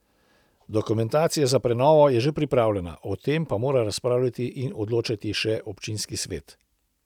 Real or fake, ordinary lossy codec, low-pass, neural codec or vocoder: real; none; 19.8 kHz; none